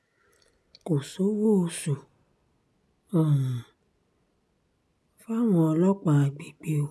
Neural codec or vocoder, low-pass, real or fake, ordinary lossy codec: none; none; real; none